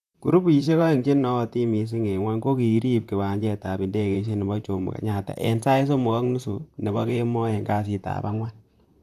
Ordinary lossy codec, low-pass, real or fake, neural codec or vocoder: none; 14.4 kHz; fake; vocoder, 44.1 kHz, 128 mel bands, Pupu-Vocoder